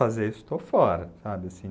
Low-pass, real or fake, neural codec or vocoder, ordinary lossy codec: none; real; none; none